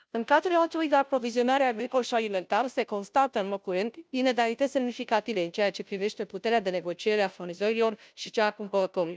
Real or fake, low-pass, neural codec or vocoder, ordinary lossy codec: fake; none; codec, 16 kHz, 0.5 kbps, FunCodec, trained on Chinese and English, 25 frames a second; none